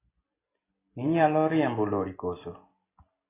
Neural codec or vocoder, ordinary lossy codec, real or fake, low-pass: none; AAC, 16 kbps; real; 3.6 kHz